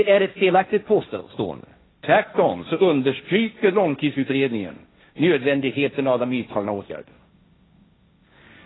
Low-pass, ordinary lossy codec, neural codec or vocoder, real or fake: 7.2 kHz; AAC, 16 kbps; codec, 16 kHz, 1.1 kbps, Voila-Tokenizer; fake